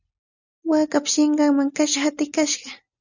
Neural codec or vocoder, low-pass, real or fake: none; 7.2 kHz; real